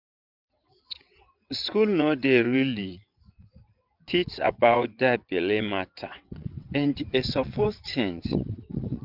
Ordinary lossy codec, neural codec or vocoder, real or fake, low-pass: none; vocoder, 22.05 kHz, 80 mel bands, WaveNeXt; fake; 5.4 kHz